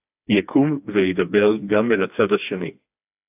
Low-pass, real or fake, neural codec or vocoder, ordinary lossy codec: 3.6 kHz; fake; codec, 16 kHz, 2 kbps, FreqCodec, smaller model; AAC, 32 kbps